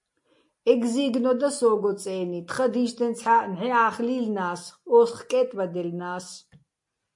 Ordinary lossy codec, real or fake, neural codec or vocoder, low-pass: MP3, 48 kbps; real; none; 10.8 kHz